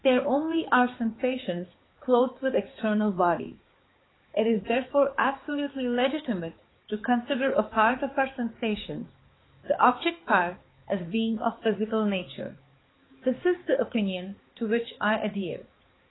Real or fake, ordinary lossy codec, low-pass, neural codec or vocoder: fake; AAC, 16 kbps; 7.2 kHz; codec, 16 kHz, 4 kbps, X-Codec, HuBERT features, trained on balanced general audio